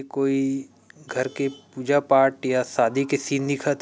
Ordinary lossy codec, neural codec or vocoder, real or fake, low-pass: none; none; real; none